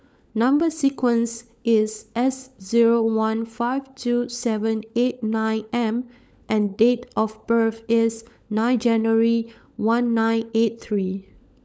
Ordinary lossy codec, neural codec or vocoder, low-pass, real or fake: none; codec, 16 kHz, 16 kbps, FunCodec, trained on LibriTTS, 50 frames a second; none; fake